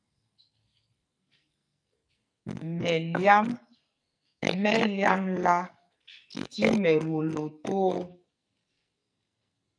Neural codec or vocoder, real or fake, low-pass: codec, 44.1 kHz, 2.6 kbps, SNAC; fake; 9.9 kHz